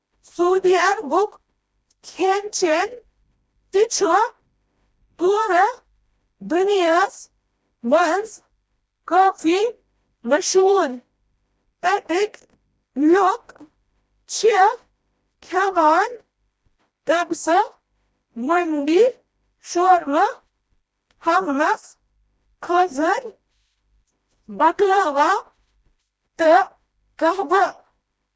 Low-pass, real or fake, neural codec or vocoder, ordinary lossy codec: none; fake; codec, 16 kHz, 1 kbps, FreqCodec, smaller model; none